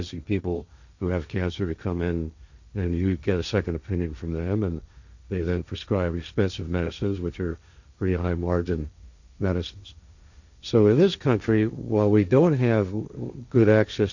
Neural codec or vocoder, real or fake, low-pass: codec, 16 kHz, 1.1 kbps, Voila-Tokenizer; fake; 7.2 kHz